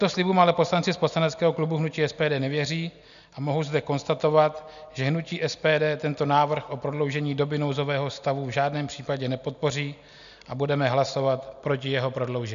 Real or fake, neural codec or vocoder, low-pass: real; none; 7.2 kHz